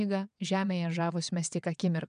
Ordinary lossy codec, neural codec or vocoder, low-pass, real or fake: MP3, 96 kbps; vocoder, 22.05 kHz, 80 mel bands, WaveNeXt; 9.9 kHz; fake